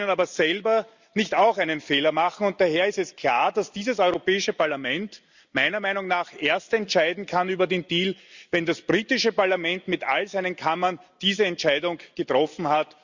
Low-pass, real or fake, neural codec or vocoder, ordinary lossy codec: 7.2 kHz; real; none; Opus, 64 kbps